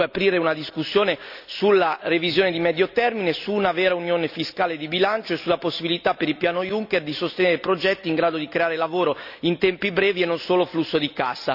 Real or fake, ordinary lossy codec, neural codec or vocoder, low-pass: real; none; none; 5.4 kHz